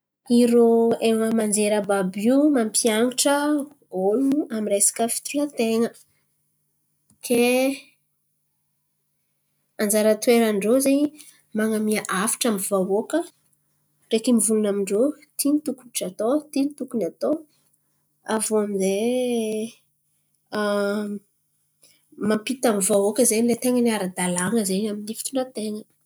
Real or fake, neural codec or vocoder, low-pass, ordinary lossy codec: real; none; none; none